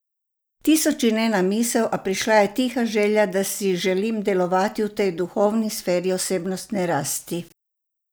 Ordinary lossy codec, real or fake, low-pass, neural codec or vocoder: none; real; none; none